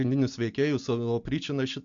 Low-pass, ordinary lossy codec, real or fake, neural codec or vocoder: 7.2 kHz; MP3, 64 kbps; real; none